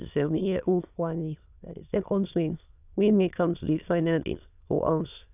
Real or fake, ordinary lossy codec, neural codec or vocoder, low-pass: fake; none; autoencoder, 22.05 kHz, a latent of 192 numbers a frame, VITS, trained on many speakers; 3.6 kHz